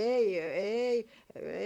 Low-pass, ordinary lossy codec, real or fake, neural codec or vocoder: 19.8 kHz; none; fake; vocoder, 44.1 kHz, 128 mel bands, Pupu-Vocoder